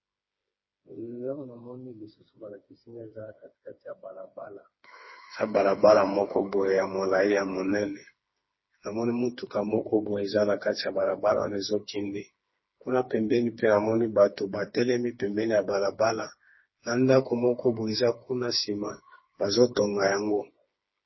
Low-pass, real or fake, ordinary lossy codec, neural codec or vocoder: 7.2 kHz; fake; MP3, 24 kbps; codec, 16 kHz, 4 kbps, FreqCodec, smaller model